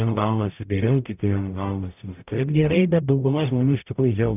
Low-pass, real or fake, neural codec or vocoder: 3.6 kHz; fake; codec, 44.1 kHz, 0.9 kbps, DAC